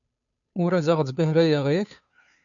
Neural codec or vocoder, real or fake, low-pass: codec, 16 kHz, 2 kbps, FunCodec, trained on Chinese and English, 25 frames a second; fake; 7.2 kHz